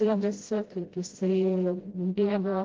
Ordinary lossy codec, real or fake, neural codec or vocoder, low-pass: Opus, 16 kbps; fake; codec, 16 kHz, 0.5 kbps, FreqCodec, smaller model; 7.2 kHz